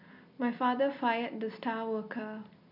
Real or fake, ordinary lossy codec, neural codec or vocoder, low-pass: real; none; none; 5.4 kHz